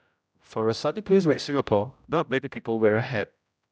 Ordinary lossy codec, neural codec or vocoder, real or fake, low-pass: none; codec, 16 kHz, 0.5 kbps, X-Codec, HuBERT features, trained on general audio; fake; none